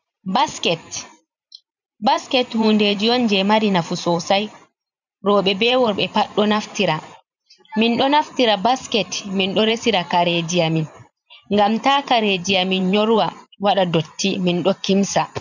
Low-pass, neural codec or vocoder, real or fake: 7.2 kHz; vocoder, 44.1 kHz, 128 mel bands every 512 samples, BigVGAN v2; fake